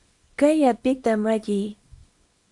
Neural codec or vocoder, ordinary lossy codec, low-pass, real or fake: codec, 24 kHz, 0.9 kbps, WavTokenizer, small release; Opus, 64 kbps; 10.8 kHz; fake